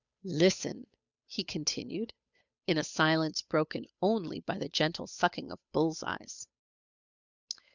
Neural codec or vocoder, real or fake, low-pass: codec, 16 kHz, 8 kbps, FunCodec, trained on Chinese and English, 25 frames a second; fake; 7.2 kHz